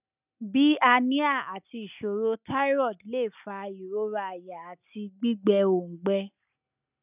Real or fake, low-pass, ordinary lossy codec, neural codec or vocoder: real; 3.6 kHz; none; none